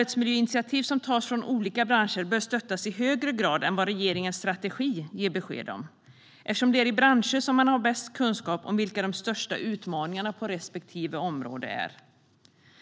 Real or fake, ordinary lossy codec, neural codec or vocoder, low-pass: real; none; none; none